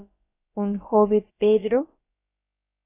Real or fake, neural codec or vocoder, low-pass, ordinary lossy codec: fake; codec, 16 kHz, about 1 kbps, DyCAST, with the encoder's durations; 3.6 kHz; AAC, 16 kbps